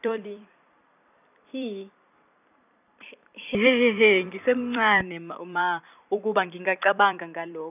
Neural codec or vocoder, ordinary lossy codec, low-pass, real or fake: vocoder, 44.1 kHz, 128 mel bands every 256 samples, BigVGAN v2; none; 3.6 kHz; fake